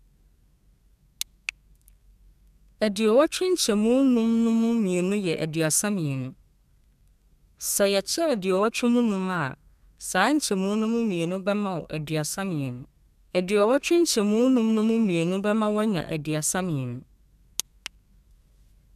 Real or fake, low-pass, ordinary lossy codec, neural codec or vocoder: fake; 14.4 kHz; none; codec, 32 kHz, 1.9 kbps, SNAC